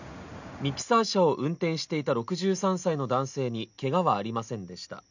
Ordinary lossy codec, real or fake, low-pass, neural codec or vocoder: none; real; 7.2 kHz; none